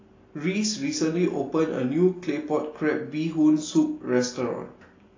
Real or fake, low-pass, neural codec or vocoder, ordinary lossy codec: real; 7.2 kHz; none; AAC, 32 kbps